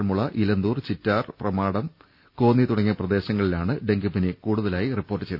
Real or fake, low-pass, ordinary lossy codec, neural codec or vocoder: real; 5.4 kHz; none; none